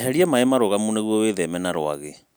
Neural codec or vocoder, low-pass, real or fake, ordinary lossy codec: none; none; real; none